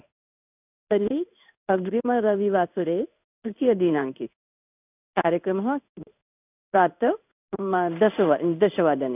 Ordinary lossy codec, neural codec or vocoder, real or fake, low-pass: none; codec, 16 kHz in and 24 kHz out, 1 kbps, XY-Tokenizer; fake; 3.6 kHz